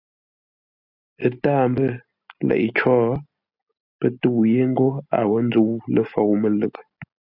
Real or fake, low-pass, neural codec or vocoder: real; 5.4 kHz; none